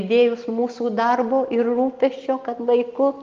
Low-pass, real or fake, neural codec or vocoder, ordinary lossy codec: 7.2 kHz; real; none; Opus, 32 kbps